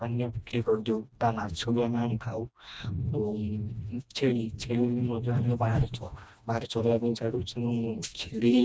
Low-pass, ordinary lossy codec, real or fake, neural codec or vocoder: none; none; fake; codec, 16 kHz, 1 kbps, FreqCodec, smaller model